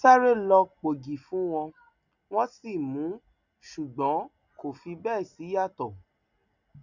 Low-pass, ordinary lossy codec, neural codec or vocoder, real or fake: 7.2 kHz; none; none; real